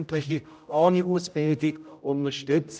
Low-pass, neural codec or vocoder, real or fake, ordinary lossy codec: none; codec, 16 kHz, 0.5 kbps, X-Codec, HuBERT features, trained on general audio; fake; none